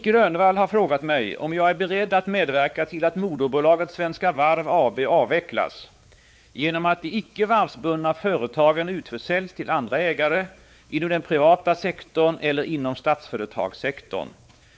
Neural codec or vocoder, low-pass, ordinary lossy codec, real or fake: codec, 16 kHz, 4 kbps, X-Codec, WavLM features, trained on Multilingual LibriSpeech; none; none; fake